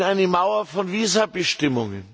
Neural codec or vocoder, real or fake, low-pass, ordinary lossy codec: none; real; none; none